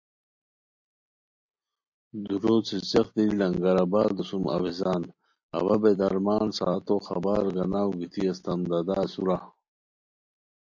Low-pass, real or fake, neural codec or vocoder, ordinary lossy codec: 7.2 kHz; real; none; MP3, 48 kbps